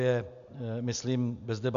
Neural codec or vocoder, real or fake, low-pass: none; real; 7.2 kHz